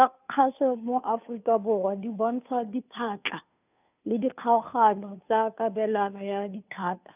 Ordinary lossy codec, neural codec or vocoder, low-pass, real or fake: none; codec, 16 kHz, 2 kbps, FunCodec, trained on Chinese and English, 25 frames a second; 3.6 kHz; fake